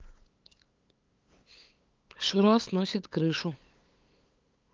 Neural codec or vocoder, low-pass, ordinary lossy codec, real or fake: codec, 16 kHz, 8 kbps, FunCodec, trained on LibriTTS, 25 frames a second; 7.2 kHz; Opus, 32 kbps; fake